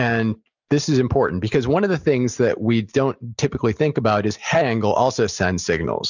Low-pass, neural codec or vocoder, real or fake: 7.2 kHz; none; real